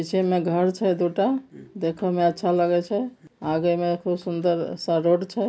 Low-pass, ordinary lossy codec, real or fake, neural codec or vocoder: none; none; real; none